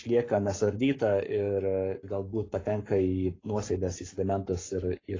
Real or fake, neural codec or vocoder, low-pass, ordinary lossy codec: fake; codec, 44.1 kHz, 7.8 kbps, Pupu-Codec; 7.2 kHz; AAC, 32 kbps